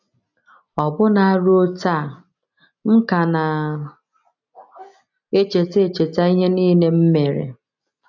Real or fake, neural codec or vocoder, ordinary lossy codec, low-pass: real; none; none; 7.2 kHz